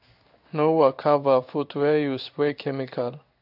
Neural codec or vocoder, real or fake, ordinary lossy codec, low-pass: codec, 16 kHz in and 24 kHz out, 1 kbps, XY-Tokenizer; fake; AAC, 48 kbps; 5.4 kHz